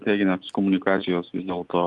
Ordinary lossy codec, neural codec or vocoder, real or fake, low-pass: Opus, 32 kbps; none; real; 10.8 kHz